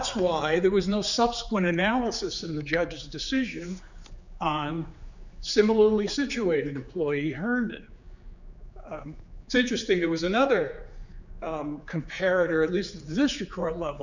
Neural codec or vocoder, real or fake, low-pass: codec, 16 kHz, 4 kbps, X-Codec, HuBERT features, trained on general audio; fake; 7.2 kHz